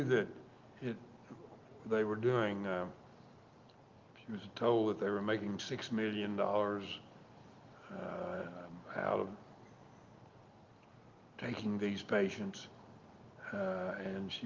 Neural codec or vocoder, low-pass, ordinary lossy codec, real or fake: none; 7.2 kHz; Opus, 32 kbps; real